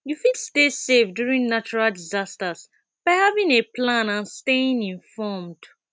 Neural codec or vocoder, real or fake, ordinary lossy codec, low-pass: none; real; none; none